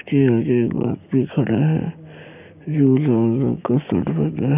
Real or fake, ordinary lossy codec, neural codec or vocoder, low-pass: fake; none; codec, 16 kHz, 6 kbps, DAC; 3.6 kHz